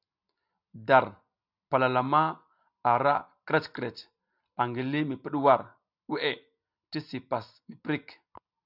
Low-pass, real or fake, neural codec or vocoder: 5.4 kHz; real; none